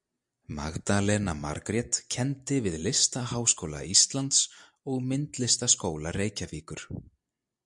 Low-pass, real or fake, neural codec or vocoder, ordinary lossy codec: 10.8 kHz; real; none; MP3, 96 kbps